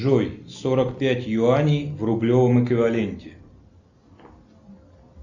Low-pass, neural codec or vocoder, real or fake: 7.2 kHz; none; real